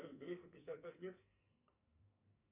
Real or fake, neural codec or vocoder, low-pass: fake; codec, 44.1 kHz, 2.6 kbps, SNAC; 3.6 kHz